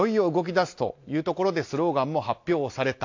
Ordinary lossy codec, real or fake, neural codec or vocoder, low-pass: AAC, 48 kbps; real; none; 7.2 kHz